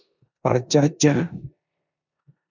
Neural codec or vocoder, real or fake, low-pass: codec, 16 kHz in and 24 kHz out, 0.9 kbps, LongCat-Audio-Codec, four codebook decoder; fake; 7.2 kHz